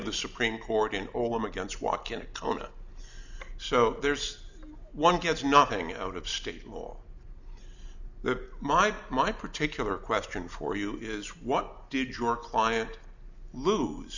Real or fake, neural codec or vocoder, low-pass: real; none; 7.2 kHz